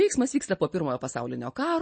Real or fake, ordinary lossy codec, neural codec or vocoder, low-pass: real; MP3, 32 kbps; none; 9.9 kHz